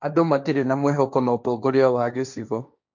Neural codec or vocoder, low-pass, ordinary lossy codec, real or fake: codec, 16 kHz, 1.1 kbps, Voila-Tokenizer; 7.2 kHz; none; fake